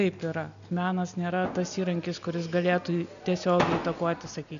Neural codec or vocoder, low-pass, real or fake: none; 7.2 kHz; real